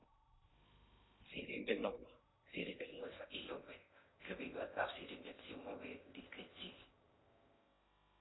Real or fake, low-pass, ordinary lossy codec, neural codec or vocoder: fake; 7.2 kHz; AAC, 16 kbps; codec, 16 kHz in and 24 kHz out, 0.6 kbps, FocalCodec, streaming, 2048 codes